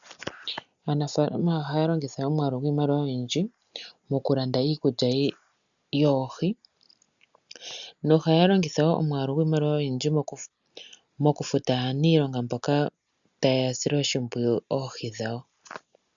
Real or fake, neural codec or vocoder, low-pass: real; none; 7.2 kHz